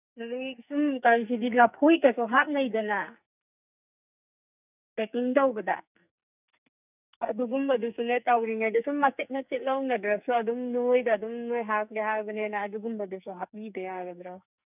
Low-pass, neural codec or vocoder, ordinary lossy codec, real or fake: 3.6 kHz; codec, 44.1 kHz, 2.6 kbps, SNAC; none; fake